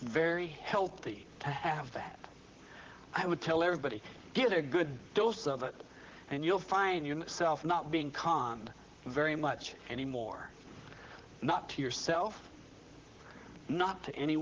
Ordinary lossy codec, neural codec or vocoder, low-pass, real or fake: Opus, 16 kbps; vocoder, 44.1 kHz, 128 mel bands, Pupu-Vocoder; 7.2 kHz; fake